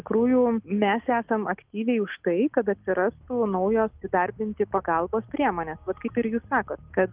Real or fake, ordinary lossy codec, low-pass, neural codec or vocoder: real; Opus, 24 kbps; 3.6 kHz; none